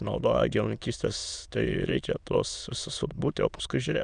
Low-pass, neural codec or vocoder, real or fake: 9.9 kHz; autoencoder, 22.05 kHz, a latent of 192 numbers a frame, VITS, trained on many speakers; fake